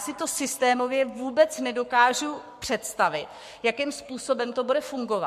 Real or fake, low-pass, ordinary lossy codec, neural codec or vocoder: fake; 14.4 kHz; MP3, 64 kbps; codec, 44.1 kHz, 7.8 kbps, Pupu-Codec